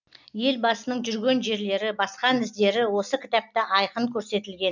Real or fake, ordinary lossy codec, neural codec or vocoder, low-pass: real; none; none; 7.2 kHz